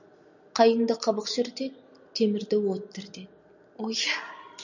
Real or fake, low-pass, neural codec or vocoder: real; 7.2 kHz; none